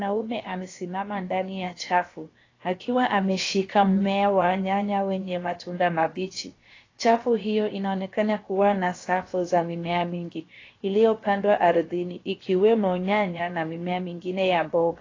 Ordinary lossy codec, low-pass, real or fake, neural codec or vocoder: AAC, 32 kbps; 7.2 kHz; fake; codec, 16 kHz, 0.7 kbps, FocalCodec